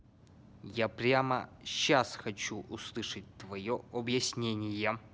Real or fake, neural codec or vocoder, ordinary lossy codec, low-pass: real; none; none; none